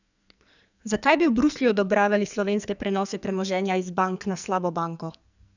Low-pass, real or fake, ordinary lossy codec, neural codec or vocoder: 7.2 kHz; fake; none; codec, 44.1 kHz, 2.6 kbps, SNAC